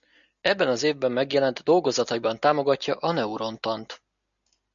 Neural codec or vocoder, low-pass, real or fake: none; 7.2 kHz; real